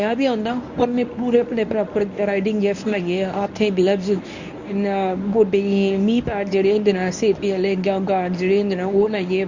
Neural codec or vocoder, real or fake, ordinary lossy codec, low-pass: codec, 24 kHz, 0.9 kbps, WavTokenizer, medium speech release version 1; fake; Opus, 64 kbps; 7.2 kHz